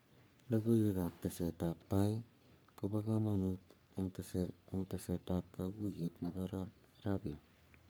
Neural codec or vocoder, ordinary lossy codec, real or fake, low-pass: codec, 44.1 kHz, 3.4 kbps, Pupu-Codec; none; fake; none